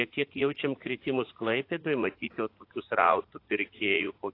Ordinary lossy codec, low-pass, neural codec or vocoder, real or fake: AAC, 32 kbps; 5.4 kHz; vocoder, 44.1 kHz, 80 mel bands, Vocos; fake